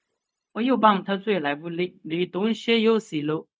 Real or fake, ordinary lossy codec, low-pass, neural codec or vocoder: fake; none; none; codec, 16 kHz, 0.4 kbps, LongCat-Audio-Codec